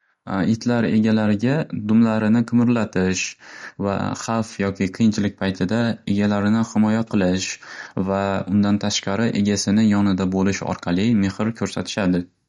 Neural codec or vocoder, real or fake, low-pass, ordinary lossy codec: none; real; 19.8 kHz; MP3, 48 kbps